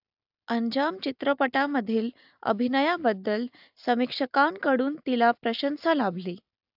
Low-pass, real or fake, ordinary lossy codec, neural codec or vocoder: 5.4 kHz; real; AAC, 48 kbps; none